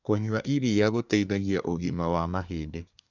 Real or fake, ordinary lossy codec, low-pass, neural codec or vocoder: fake; none; 7.2 kHz; codec, 24 kHz, 1 kbps, SNAC